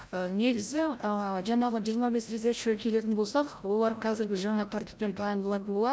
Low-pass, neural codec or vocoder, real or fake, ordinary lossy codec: none; codec, 16 kHz, 0.5 kbps, FreqCodec, larger model; fake; none